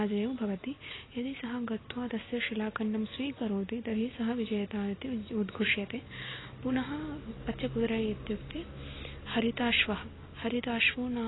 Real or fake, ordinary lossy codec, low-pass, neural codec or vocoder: real; AAC, 16 kbps; 7.2 kHz; none